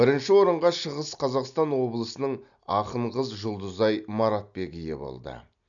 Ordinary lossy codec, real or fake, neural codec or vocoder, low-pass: none; real; none; 7.2 kHz